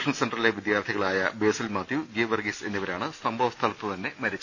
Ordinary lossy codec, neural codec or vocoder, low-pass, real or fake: none; none; 7.2 kHz; real